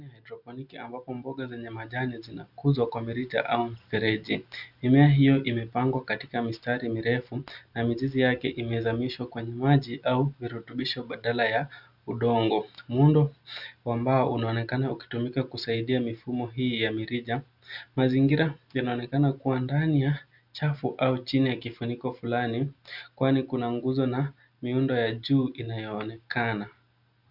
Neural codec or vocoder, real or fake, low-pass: none; real; 5.4 kHz